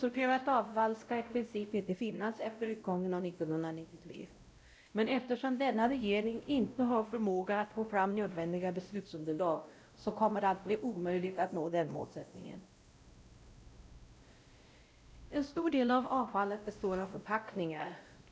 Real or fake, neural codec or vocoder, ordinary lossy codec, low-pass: fake; codec, 16 kHz, 0.5 kbps, X-Codec, WavLM features, trained on Multilingual LibriSpeech; none; none